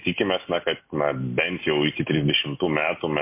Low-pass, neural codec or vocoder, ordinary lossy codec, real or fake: 3.6 kHz; none; MP3, 24 kbps; real